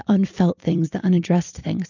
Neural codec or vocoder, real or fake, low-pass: vocoder, 44.1 kHz, 128 mel bands every 256 samples, BigVGAN v2; fake; 7.2 kHz